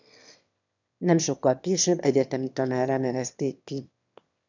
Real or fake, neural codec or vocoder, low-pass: fake; autoencoder, 22.05 kHz, a latent of 192 numbers a frame, VITS, trained on one speaker; 7.2 kHz